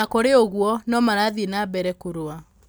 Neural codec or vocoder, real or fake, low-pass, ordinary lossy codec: none; real; none; none